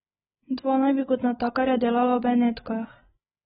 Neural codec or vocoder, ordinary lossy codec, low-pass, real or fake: codec, 16 kHz, 8 kbps, FreqCodec, larger model; AAC, 16 kbps; 7.2 kHz; fake